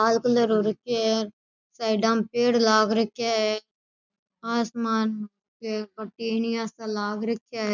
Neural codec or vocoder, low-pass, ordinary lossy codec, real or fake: none; 7.2 kHz; none; real